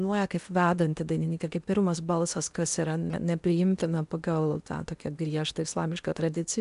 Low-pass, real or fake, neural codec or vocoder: 10.8 kHz; fake; codec, 16 kHz in and 24 kHz out, 0.8 kbps, FocalCodec, streaming, 65536 codes